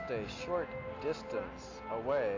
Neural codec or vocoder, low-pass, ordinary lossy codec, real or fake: none; 7.2 kHz; AAC, 32 kbps; real